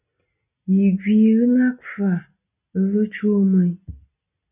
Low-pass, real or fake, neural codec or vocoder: 3.6 kHz; real; none